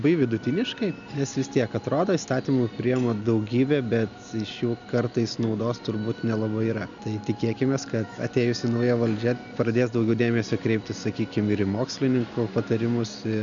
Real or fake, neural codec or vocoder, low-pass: real; none; 7.2 kHz